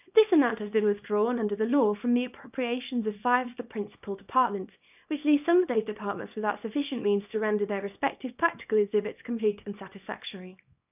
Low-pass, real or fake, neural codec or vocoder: 3.6 kHz; fake; codec, 24 kHz, 0.9 kbps, WavTokenizer, small release